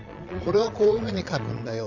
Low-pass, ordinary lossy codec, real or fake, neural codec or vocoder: 7.2 kHz; none; fake; vocoder, 22.05 kHz, 80 mel bands, Vocos